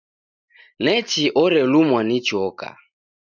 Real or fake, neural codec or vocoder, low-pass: real; none; 7.2 kHz